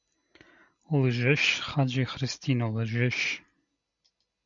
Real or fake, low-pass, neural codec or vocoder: real; 7.2 kHz; none